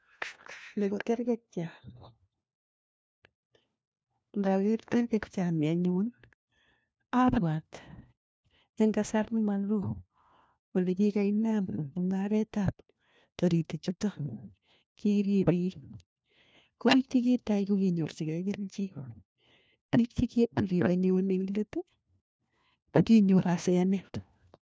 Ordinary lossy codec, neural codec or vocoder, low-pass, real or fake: none; codec, 16 kHz, 1 kbps, FunCodec, trained on LibriTTS, 50 frames a second; none; fake